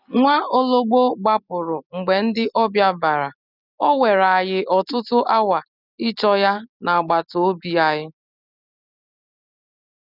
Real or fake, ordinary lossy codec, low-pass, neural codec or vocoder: real; none; 5.4 kHz; none